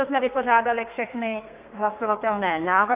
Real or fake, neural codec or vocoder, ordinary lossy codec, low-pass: fake; codec, 32 kHz, 1.9 kbps, SNAC; Opus, 24 kbps; 3.6 kHz